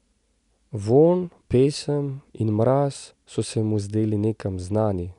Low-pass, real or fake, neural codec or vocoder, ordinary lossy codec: 10.8 kHz; real; none; none